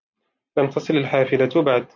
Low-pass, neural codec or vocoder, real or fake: 7.2 kHz; none; real